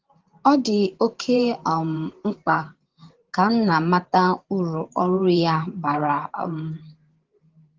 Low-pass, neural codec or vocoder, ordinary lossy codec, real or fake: 7.2 kHz; vocoder, 44.1 kHz, 128 mel bands every 512 samples, BigVGAN v2; Opus, 16 kbps; fake